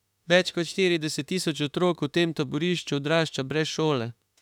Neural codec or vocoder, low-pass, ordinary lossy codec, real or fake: autoencoder, 48 kHz, 32 numbers a frame, DAC-VAE, trained on Japanese speech; 19.8 kHz; none; fake